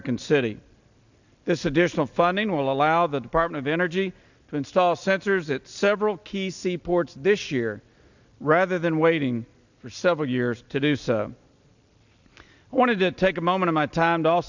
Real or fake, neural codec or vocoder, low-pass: real; none; 7.2 kHz